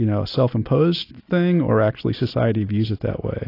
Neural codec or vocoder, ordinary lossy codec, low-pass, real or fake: none; AAC, 32 kbps; 5.4 kHz; real